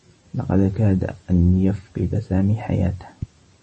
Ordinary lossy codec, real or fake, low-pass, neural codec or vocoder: MP3, 32 kbps; real; 10.8 kHz; none